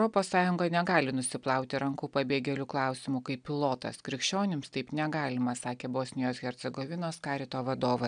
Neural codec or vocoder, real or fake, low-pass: none; real; 9.9 kHz